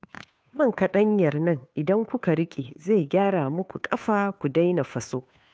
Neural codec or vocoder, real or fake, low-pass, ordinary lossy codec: codec, 16 kHz, 2 kbps, FunCodec, trained on Chinese and English, 25 frames a second; fake; none; none